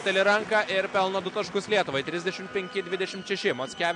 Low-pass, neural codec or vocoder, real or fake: 9.9 kHz; none; real